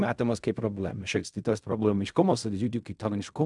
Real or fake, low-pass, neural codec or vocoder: fake; 10.8 kHz; codec, 16 kHz in and 24 kHz out, 0.4 kbps, LongCat-Audio-Codec, fine tuned four codebook decoder